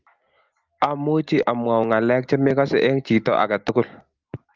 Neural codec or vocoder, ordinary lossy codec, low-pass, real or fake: none; Opus, 24 kbps; 7.2 kHz; real